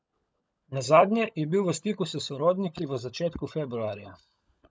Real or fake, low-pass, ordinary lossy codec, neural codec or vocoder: fake; none; none; codec, 16 kHz, 16 kbps, FunCodec, trained on LibriTTS, 50 frames a second